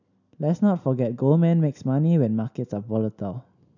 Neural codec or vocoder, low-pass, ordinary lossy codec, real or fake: none; 7.2 kHz; none; real